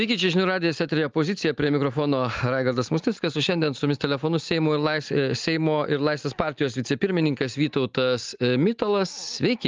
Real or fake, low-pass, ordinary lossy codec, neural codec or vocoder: real; 7.2 kHz; Opus, 24 kbps; none